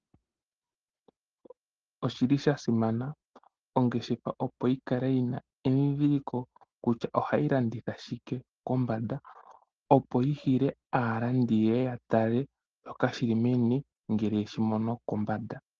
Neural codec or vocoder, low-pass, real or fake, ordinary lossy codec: none; 7.2 kHz; real; Opus, 16 kbps